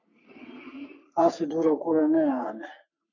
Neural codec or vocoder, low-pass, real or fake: codec, 44.1 kHz, 3.4 kbps, Pupu-Codec; 7.2 kHz; fake